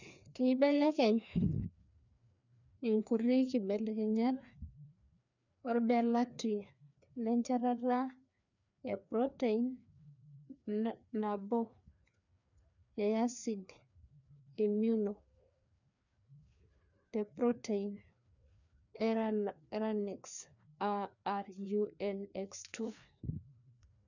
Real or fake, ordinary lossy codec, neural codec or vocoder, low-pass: fake; none; codec, 16 kHz, 2 kbps, FreqCodec, larger model; 7.2 kHz